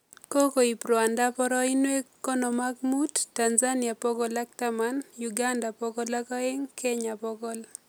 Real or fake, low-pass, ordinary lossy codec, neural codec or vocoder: real; none; none; none